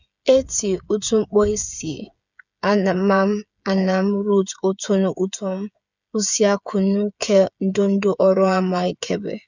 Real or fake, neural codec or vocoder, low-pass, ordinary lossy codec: fake; codec, 16 kHz, 8 kbps, FreqCodec, smaller model; 7.2 kHz; none